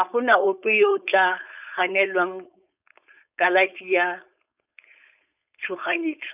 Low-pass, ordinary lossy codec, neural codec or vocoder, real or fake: 3.6 kHz; none; codec, 16 kHz, 4.8 kbps, FACodec; fake